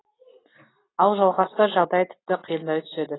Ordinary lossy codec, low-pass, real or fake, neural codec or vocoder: AAC, 16 kbps; 7.2 kHz; real; none